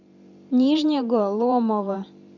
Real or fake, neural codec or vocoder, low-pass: fake; vocoder, 44.1 kHz, 128 mel bands every 512 samples, BigVGAN v2; 7.2 kHz